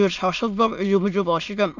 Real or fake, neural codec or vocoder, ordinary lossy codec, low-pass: fake; autoencoder, 22.05 kHz, a latent of 192 numbers a frame, VITS, trained on many speakers; none; 7.2 kHz